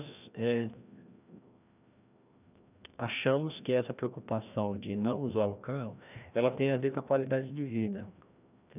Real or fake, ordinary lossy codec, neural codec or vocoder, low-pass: fake; none; codec, 16 kHz, 1 kbps, FreqCodec, larger model; 3.6 kHz